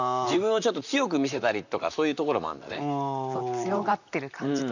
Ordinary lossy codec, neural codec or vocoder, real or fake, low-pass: none; vocoder, 44.1 kHz, 128 mel bands, Pupu-Vocoder; fake; 7.2 kHz